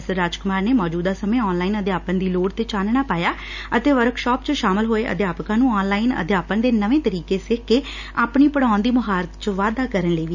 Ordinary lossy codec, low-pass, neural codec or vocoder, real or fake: none; 7.2 kHz; none; real